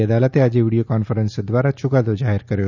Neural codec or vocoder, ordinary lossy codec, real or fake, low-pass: none; none; real; 7.2 kHz